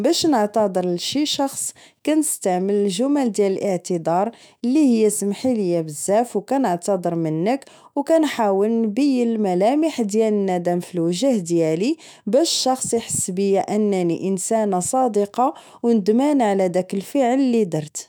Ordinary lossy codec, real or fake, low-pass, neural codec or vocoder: none; fake; none; autoencoder, 48 kHz, 128 numbers a frame, DAC-VAE, trained on Japanese speech